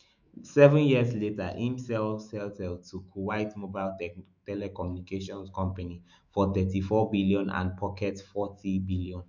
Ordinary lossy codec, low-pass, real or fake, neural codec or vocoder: none; 7.2 kHz; fake; autoencoder, 48 kHz, 128 numbers a frame, DAC-VAE, trained on Japanese speech